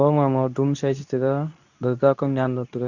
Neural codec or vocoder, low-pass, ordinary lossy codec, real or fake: codec, 24 kHz, 0.9 kbps, WavTokenizer, medium speech release version 2; 7.2 kHz; none; fake